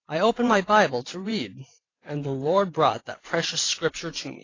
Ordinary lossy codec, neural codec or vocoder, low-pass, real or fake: AAC, 32 kbps; vocoder, 44.1 kHz, 128 mel bands every 512 samples, BigVGAN v2; 7.2 kHz; fake